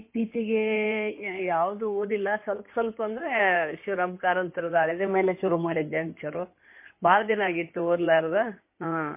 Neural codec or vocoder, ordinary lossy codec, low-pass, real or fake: codec, 16 kHz in and 24 kHz out, 2.2 kbps, FireRedTTS-2 codec; MP3, 24 kbps; 3.6 kHz; fake